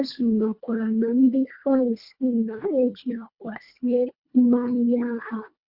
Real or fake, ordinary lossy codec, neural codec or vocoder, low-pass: fake; Opus, 64 kbps; codec, 24 kHz, 1.5 kbps, HILCodec; 5.4 kHz